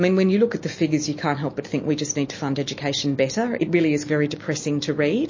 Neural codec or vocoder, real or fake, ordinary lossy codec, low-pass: none; real; MP3, 32 kbps; 7.2 kHz